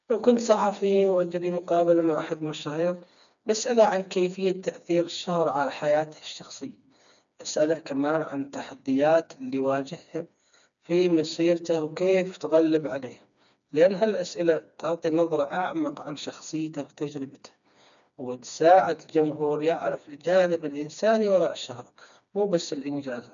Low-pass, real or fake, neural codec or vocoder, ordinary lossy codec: 7.2 kHz; fake; codec, 16 kHz, 2 kbps, FreqCodec, smaller model; none